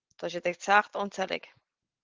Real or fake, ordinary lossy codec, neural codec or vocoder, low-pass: real; Opus, 16 kbps; none; 7.2 kHz